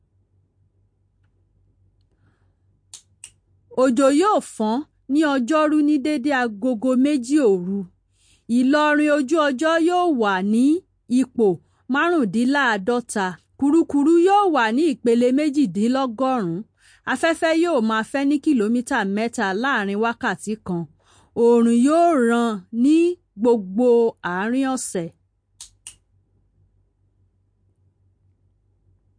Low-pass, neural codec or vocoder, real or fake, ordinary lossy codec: 9.9 kHz; none; real; MP3, 48 kbps